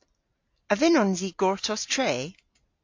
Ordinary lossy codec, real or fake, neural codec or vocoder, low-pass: AAC, 48 kbps; real; none; 7.2 kHz